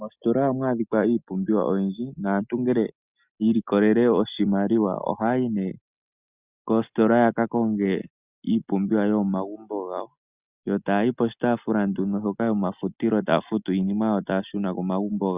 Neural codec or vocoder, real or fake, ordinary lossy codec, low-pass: none; real; Opus, 64 kbps; 3.6 kHz